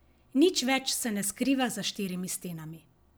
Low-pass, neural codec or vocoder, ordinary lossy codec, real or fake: none; none; none; real